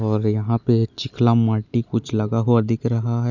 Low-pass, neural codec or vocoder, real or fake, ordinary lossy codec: 7.2 kHz; none; real; none